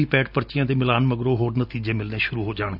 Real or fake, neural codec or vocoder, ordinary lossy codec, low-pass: real; none; none; 5.4 kHz